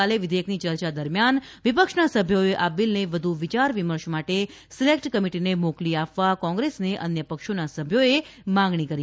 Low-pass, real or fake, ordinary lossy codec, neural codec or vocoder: none; real; none; none